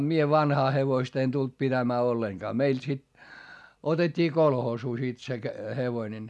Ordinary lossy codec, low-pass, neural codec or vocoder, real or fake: none; none; none; real